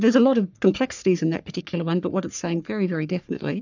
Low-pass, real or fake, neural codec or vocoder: 7.2 kHz; fake; codec, 44.1 kHz, 3.4 kbps, Pupu-Codec